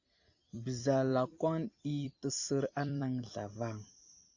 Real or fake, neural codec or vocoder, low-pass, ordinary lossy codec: fake; vocoder, 44.1 kHz, 128 mel bands every 256 samples, BigVGAN v2; 7.2 kHz; AAC, 48 kbps